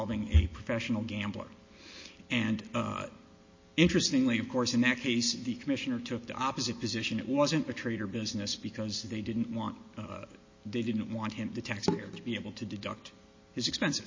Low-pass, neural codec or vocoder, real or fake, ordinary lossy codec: 7.2 kHz; none; real; MP3, 32 kbps